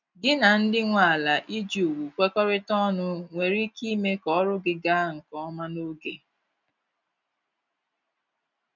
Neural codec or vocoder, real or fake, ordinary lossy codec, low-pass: none; real; none; 7.2 kHz